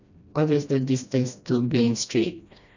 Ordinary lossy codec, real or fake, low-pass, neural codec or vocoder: none; fake; 7.2 kHz; codec, 16 kHz, 1 kbps, FreqCodec, smaller model